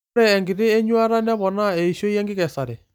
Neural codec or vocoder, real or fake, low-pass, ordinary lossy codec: none; real; 19.8 kHz; none